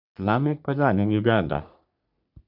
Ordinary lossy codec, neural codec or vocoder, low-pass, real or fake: none; codec, 44.1 kHz, 3.4 kbps, Pupu-Codec; 5.4 kHz; fake